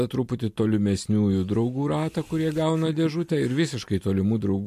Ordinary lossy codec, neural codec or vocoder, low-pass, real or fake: MP3, 64 kbps; none; 14.4 kHz; real